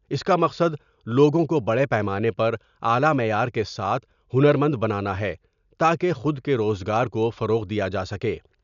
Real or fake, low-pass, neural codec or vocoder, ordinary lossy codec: real; 7.2 kHz; none; none